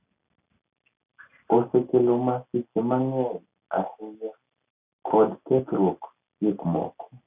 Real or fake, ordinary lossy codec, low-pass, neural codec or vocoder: real; Opus, 64 kbps; 3.6 kHz; none